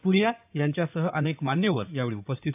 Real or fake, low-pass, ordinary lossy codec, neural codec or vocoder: fake; 3.6 kHz; AAC, 32 kbps; codec, 16 kHz in and 24 kHz out, 2.2 kbps, FireRedTTS-2 codec